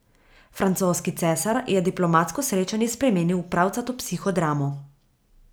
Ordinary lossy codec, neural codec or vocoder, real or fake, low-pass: none; none; real; none